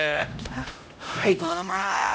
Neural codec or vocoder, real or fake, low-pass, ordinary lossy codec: codec, 16 kHz, 0.5 kbps, X-Codec, HuBERT features, trained on LibriSpeech; fake; none; none